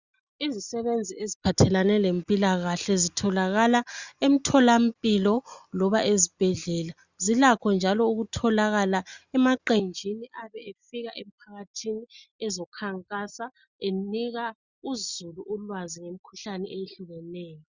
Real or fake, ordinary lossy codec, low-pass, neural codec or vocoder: real; Opus, 64 kbps; 7.2 kHz; none